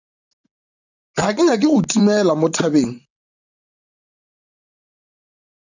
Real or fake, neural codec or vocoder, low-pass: fake; vocoder, 44.1 kHz, 128 mel bands, Pupu-Vocoder; 7.2 kHz